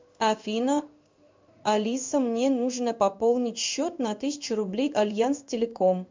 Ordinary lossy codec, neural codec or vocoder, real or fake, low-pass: MP3, 64 kbps; codec, 16 kHz in and 24 kHz out, 1 kbps, XY-Tokenizer; fake; 7.2 kHz